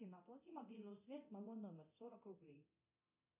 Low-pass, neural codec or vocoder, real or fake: 3.6 kHz; codec, 24 kHz, 0.9 kbps, DualCodec; fake